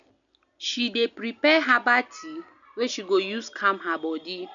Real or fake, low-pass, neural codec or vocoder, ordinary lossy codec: real; 7.2 kHz; none; none